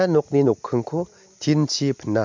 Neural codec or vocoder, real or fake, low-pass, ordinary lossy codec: none; real; 7.2 kHz; MP3, 48 kbps